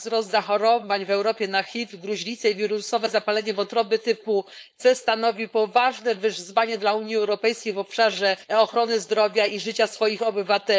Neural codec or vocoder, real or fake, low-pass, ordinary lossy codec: codec, 16 kHz, 4.8 kbps, FACodec; fake; none; none